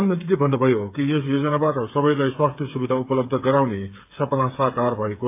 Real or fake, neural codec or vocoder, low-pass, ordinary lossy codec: fake; codec, 16 kHz, 8 kbps, FreqCodec, smaller model; 3.6 kHz; AAC, 32 kbps